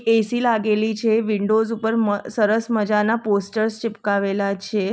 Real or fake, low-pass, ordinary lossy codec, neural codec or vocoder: real; none; none; none